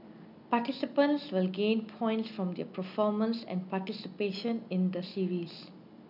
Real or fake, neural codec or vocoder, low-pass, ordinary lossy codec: real; none; 5.4 kHz; none